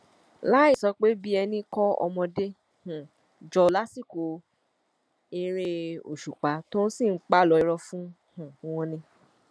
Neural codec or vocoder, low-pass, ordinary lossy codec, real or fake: none; none; none; real